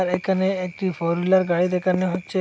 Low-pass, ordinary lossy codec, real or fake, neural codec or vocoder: none; none; real; none